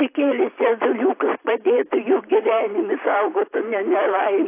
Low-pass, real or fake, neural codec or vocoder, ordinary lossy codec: 3.6 kHz; fake; vocoder, 44.1 kHz, 128 mel bands every 512 samples, BigVGAN v2; AAC, 24 kbps